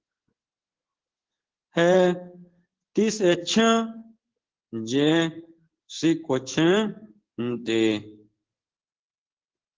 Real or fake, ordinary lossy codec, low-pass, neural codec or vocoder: fake; Opus, 16 kbps; 7.2 kHz; codec, 16 kHz in and 24 kHz out, 1 kbps, XY-Tokenizer